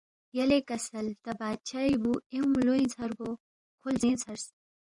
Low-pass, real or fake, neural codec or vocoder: 10.8 kHz; fake; vocoder, 24 kHz, 100 mel bands, Vocos